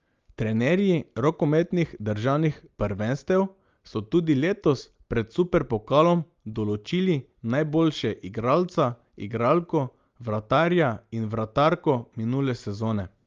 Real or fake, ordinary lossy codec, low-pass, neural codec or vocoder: real; Opus, 32 kbps; 7.2 kHz; none